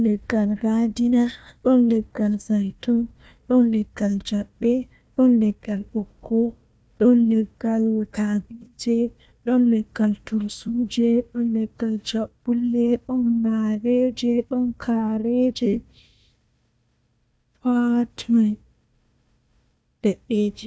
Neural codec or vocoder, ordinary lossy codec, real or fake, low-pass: codec, 16 kHz, 1 kbps, FunCodec, trained on Chinese and English, 50 frames a second; none; fake; none